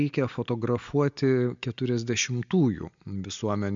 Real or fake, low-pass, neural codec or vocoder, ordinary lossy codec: real; 7.2 kHz; none; MP3, 64 kbps